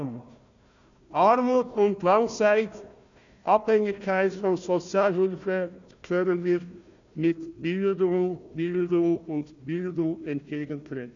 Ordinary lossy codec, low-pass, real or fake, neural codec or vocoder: none; 7.2 kHz; fake; codec, 16 kHz, 1 kbps, FunCodec, trained on Chinese and English, 50 frames a second